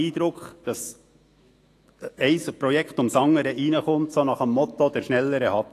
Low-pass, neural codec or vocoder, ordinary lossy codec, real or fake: 14.4 kHz; autoencoder, 48 kHz, 128 numbers a frame, DAC-VAE, trained on Japanese speech; AAC, 48 kbps; fake